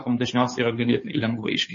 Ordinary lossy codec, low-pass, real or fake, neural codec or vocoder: MP3, 32 kbps; 7.2 kHz; fake; codec, 16 kHz, 2 kbps, FunCodec, trained on Chinese and English, 25 frames a second